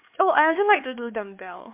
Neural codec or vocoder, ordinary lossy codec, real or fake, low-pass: codec, 16 kHz, 4 kbps, X-Codec, HuBERT features, trained on LibriSpeech; MP3, 32 kbps; fake; 3.6 kHz